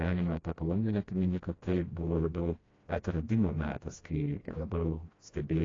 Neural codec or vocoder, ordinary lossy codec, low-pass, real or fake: codec, 16 kHz, 1 kbps, FreqCodec, smaller model; AAC, 32 kbps; 7.2 kHz; fake